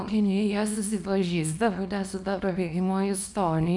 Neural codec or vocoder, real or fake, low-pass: codec, 24 kHz, 0.9 kbps, WavTokenizer, small release; fake; 10.8 kHz